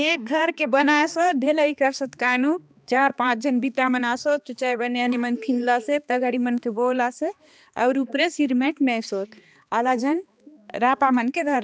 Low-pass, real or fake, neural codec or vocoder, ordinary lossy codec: none; fake; codec, 16 kHz, 2 kbps, X-Codec, HuBERT features, trained on balanced general audio; none